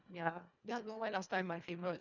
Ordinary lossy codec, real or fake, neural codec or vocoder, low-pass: none; fake; codec, 24 kHz, 1.5 kbps, HILCodec; 7.2 kHz